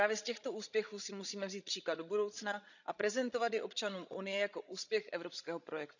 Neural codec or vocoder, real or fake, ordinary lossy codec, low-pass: codec, 16 kHz, 16 kbps, FreqCodec, larger model; fake; none; 7.2 kHz